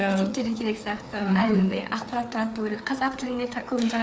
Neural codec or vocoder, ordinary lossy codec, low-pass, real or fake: codec, 16 kHz, 4 kbps, FreqCodec, larger model; none; none; fake